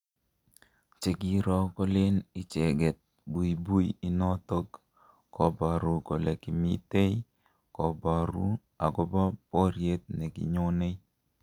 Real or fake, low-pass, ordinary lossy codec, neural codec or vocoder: real; 19.8 kHz; none; none